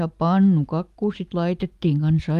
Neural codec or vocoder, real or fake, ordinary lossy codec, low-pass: none; real; Opus, 32 kbps; 14.4 kHz